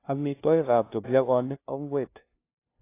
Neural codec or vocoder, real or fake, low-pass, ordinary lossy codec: codec, 16 kHz, 0.5 kbps, FunCodec, trained on LibriTTS, 25 frames a second; fake; 3.6 kHz; AAC, 24 kbps